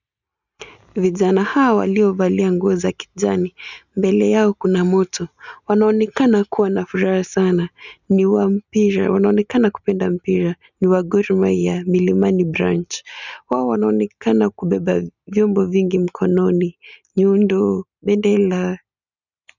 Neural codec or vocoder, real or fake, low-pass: none; real; 7.2 kHz